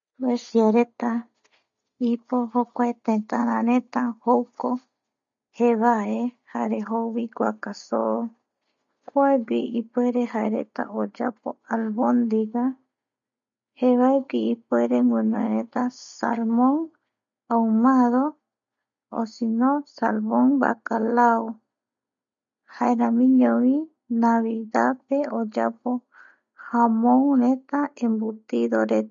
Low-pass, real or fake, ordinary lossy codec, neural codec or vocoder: 7.2 kHz; real; none; none